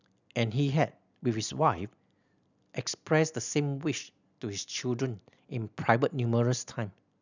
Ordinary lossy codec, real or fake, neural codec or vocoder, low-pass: none; real; none; 7.2 kHz